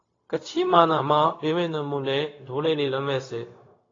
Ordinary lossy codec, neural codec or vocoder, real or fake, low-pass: AAC, 32 kbps; codec, 16 kHz, 0.4 kbps, LongCat-Audio-Codec; fake; 7.2 kHz